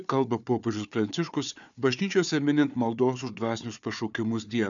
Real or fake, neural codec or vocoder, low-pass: fake; codec, 16 kHz, 4 kbps, FunCodec, trained on Chinese and English, 50 frames a second; 7.2 kHz